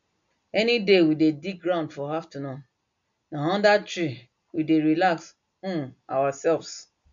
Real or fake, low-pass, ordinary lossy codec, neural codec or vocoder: real; 7.2 kHz; MP3, 64 kbps; none